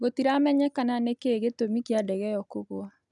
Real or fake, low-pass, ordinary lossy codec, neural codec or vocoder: real; 10.8 kHz; none; none